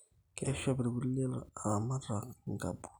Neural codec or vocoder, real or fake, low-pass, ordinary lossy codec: none; real; none; none